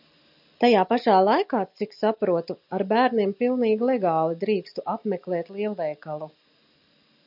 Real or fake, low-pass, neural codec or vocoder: real; 5.4 kHz; none